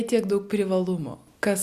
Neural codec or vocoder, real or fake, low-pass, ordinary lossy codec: none; real; 14.4 kHz; Opus, 64 kbps